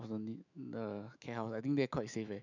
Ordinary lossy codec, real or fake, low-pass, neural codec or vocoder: none; fake; 7.2 kHz; autoencoder, 48 kHz, 128 numbers a frame, DAC-VAE, trained on Japanese speech